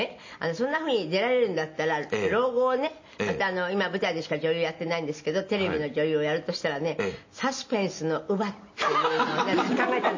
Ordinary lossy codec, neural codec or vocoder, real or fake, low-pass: MP3, 32 kbps; none; real; 7.2 kHz